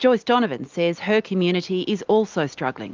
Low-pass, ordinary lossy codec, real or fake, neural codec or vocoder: 7.2 kHz; Opus, 24 kbps; real; none